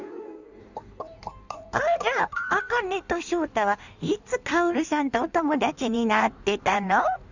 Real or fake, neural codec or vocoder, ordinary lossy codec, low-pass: fake; codec, 16 kHz in and 24 kHz out, 1.1 kbps, FireRedTTS-2 codec; none; 7.2 kHz